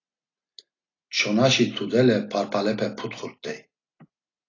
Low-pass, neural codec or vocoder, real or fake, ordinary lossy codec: 7.2 kHz; none; real; AAC, 32 kbps